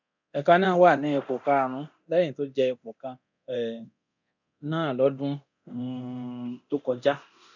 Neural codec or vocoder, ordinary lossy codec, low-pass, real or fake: codec, 24 kHz, 0.9 kbps, DualCodec; none; 7.2 kHz; fake